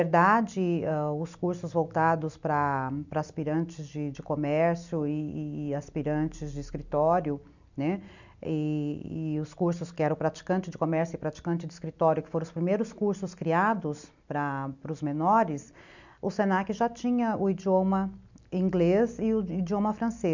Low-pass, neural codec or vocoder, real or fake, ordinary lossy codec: 7.2 kHz; none; real; none